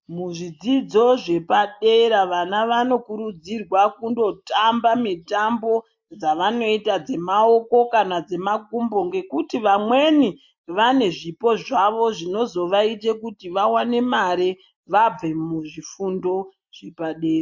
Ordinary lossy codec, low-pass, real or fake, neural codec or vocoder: MP3, 48 kbps; 7.2 kHz; real; none